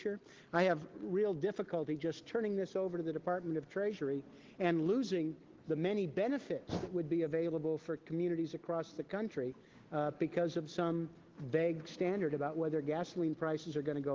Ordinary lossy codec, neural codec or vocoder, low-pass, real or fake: Opus, 16 kbps; none; 7.2 kHz; real